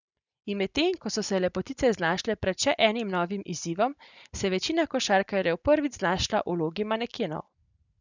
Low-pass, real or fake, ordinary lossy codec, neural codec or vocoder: 7.2 kHz; real; none; none